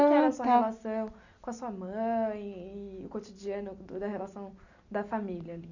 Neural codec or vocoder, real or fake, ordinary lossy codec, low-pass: none; real; none; 7.2 kHz